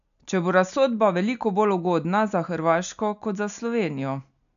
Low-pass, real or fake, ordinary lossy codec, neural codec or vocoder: 7.2 kHz; real; none; none